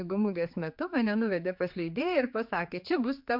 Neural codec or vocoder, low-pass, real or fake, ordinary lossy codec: codec, 16 kHz, 4 kbps, X-Codec, HuBERT features, trained on general audio; 5.4 kHz; fake; MP3, 48 kbps